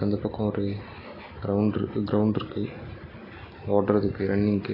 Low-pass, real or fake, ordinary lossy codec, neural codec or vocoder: 5.4 kHz; real; AAC, 24 kbps; none